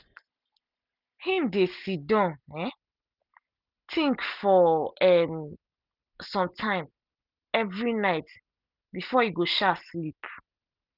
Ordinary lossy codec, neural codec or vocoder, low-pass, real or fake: none; none; 5.4 kHz; real